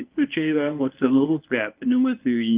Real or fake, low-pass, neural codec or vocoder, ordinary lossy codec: fake; 3.6 kHz; codec, 24 kHz, 0.9 kbps, WavTokenizer, medium speech release version 1; Opus, 32 kbps